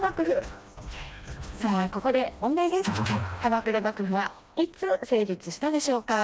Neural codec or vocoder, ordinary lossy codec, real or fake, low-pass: codec, 16 kHz, 1 kbps, FreqCodec, smaller model; none; fake; none